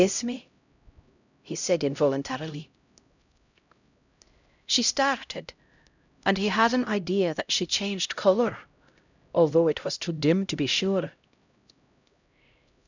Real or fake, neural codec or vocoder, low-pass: fake; codec, 16 kHz, 0.5 kbps, X-Codec, HuBERT features, trained on LibriSpeech; 7.2 kHz